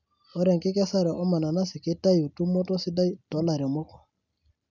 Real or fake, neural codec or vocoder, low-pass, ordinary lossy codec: real; none; 7.2 kHz; none